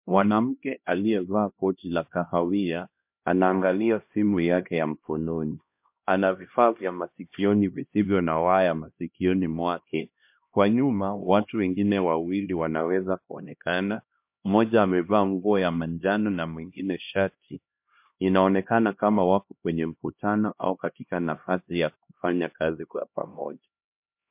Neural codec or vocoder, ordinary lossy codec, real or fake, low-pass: codec, 16 kHz, 1 kbps, X-Codec, HuBERT features, trained on LibriSpeech; MP3, 32 kbps; fake; 3.6 kHz